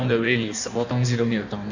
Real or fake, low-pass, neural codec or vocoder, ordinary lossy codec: fake; 7.2 kHz; codec, 16 kHz in and 24 kHz out, 1.1 kbps, FireRedTTS-2 codec; none